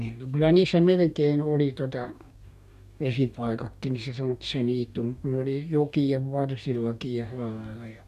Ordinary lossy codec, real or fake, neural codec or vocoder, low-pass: none; fake; codec, 44.1 kHz, 2.6 kbps, DAC; 14.4 kHz